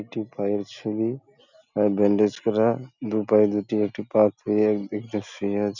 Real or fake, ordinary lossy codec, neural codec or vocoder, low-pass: real; none; none; 7.2 kHz